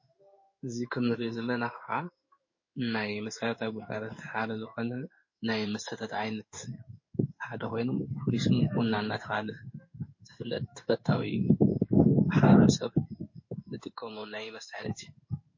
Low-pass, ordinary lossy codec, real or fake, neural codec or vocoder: 7.2 kHz; MP3, 32 kbps; fake; codec, 16 kHz in and 24 kHz out, 1 kbps, XY-Tokenizer